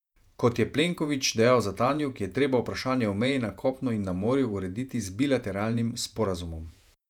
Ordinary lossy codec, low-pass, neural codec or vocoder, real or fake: none; 19.8 kHz; vocoder, 48 kHz, 128 mel bands, Vocos; fake